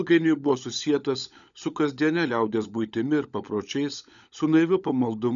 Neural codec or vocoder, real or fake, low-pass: codec, 16 kHz, 16 kbps, FunCodec, trained on LibriTTS, 50 frames a second; fake; 7.2 kHz